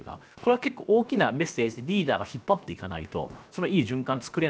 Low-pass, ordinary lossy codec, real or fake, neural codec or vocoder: none; none; fake; codec, 16 kHz, 0.7 kbps, FocalCodec